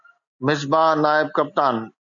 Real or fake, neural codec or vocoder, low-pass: real; none; 7.2 kHz